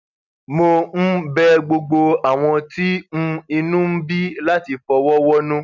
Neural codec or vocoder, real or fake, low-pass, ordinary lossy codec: none; real; 7.2 kHz; none